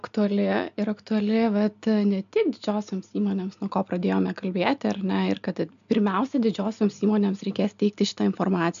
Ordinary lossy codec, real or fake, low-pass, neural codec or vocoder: AAC, 96 kbps; real; 7.2 kHz; none